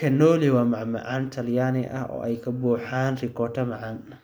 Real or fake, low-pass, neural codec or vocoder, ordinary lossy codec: real; none; none; none